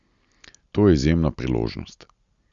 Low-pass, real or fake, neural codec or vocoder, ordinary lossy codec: 7.2 kHz; real; none; Opus, 64 kbps